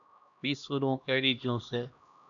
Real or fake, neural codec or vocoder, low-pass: fake; codec, 16 kHz, 1 kbps, X-Codec, HuBERT features, trained on LibriSpeech; 7.2 kHz